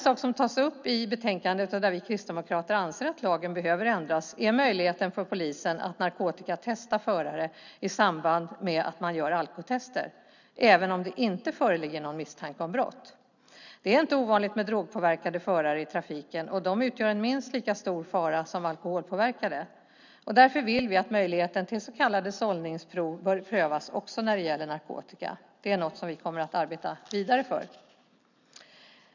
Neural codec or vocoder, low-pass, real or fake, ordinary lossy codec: none; 7.2 kHz; real; none